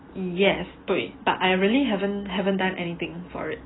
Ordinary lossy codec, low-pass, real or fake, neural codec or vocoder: AAC, 16 kbps; 7.2 kHz; real; none